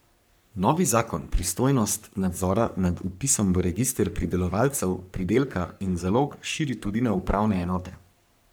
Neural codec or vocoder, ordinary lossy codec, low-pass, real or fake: codec, 44.1 kHz, 3.4 kbps, Pupu-Codec; none; none; fake